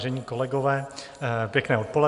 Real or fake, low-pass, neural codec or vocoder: real; 10.8 kHz; none